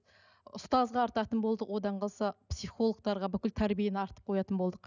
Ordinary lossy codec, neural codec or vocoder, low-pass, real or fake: none; none; 7.2 kHz; real